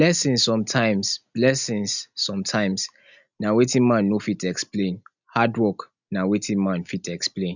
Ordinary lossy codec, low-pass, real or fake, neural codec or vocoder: none; 7.2 kHz; real; none